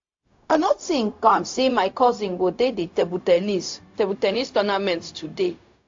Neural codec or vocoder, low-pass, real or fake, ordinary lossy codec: codec, 16 kHz, 0.4 kbps, LongCat-Audio-Codec; 7.2 kHz; fake; AAC, 48 kbps